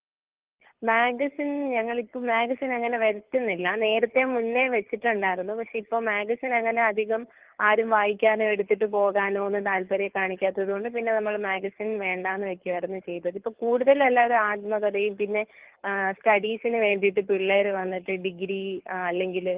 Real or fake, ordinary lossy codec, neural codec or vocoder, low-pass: fake; Opus, 16 kbps; codec, 24 kHz, 6 kbps, HILCodec; 3.6 kHz